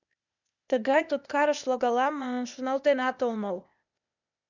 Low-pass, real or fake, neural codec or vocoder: 7.2 kHz; fake; codec, 16 kHz, 0.8 kbps, ZipCodec